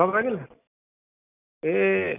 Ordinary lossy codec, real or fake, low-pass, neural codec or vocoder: none; real; 3.6 kHz; none